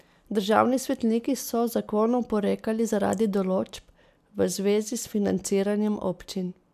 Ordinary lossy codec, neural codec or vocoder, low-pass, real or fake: none; none; 14.4 kHz; real